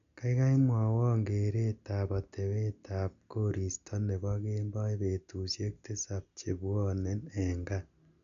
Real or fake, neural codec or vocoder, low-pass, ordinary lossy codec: real; none; 7.2 kHz; MP3, 96 kbps